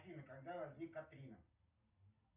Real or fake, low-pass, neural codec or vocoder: real; 3.6 kHz; none